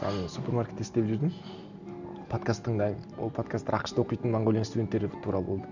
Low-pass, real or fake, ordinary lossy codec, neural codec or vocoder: 7.2 kHz; real; none; none